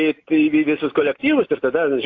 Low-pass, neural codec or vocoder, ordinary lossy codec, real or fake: 7.2 kHz; none; AAC, 48 kbps; real